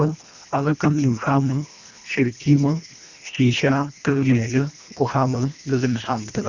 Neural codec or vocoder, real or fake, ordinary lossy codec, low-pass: codec, 24 kHz, 1.5 kbps, HILCodec; fake; Opus, 64 kbps; 7.2 kHz